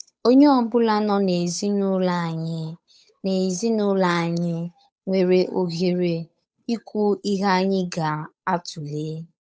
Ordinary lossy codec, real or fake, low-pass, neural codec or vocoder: none; fake; none; codec, 16 kHz, 8 kbps, FunCodec, trained on Chinese and English, 25 frames a second